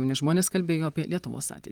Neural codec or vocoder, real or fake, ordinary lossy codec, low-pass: vocoder, 44.1 kHz, 128 mel bands, Pupu-Vocoder; fake; Opus, 32 kbps; 19.8 kHz